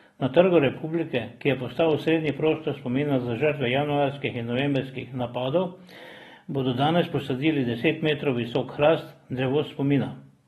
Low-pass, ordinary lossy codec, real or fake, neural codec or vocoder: 19.8 kHz; AAC, 32 kbps; real; none